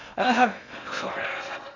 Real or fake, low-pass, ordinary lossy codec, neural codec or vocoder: fake; 7.2 kHz; none; codec, 16 kHz in and 24 kHz out, 0.6 kbps, FocalCodec, streaming, 2048 codes